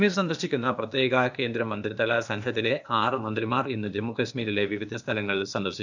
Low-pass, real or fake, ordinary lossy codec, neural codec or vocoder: 7.2 kHz; fake; none; codec, 16 kHz, 0.8 kbps, ZipCodec